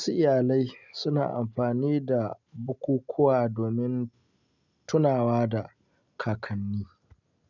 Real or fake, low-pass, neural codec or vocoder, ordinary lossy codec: real; 7.2 kHz; none; none